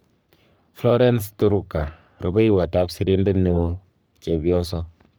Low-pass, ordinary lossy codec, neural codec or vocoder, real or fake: none; none; codec, 44.1 kHz, 3.4 kbps, Pupu-Codec; fake